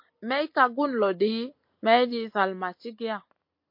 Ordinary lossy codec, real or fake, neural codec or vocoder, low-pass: MP3, 32 kbps; real; none; 5.4 kHz